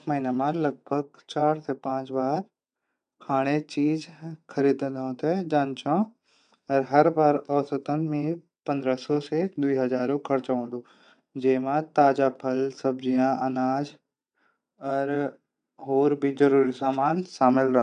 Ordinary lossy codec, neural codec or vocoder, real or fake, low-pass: none; vocoder, 22.05 kHz, 80 mel bands, WaveNeXt; fake; 9.9 kHz